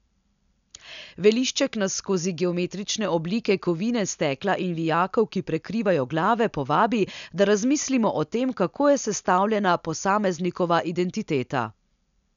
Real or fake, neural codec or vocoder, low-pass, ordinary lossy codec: real; none; 7.2 kHz; none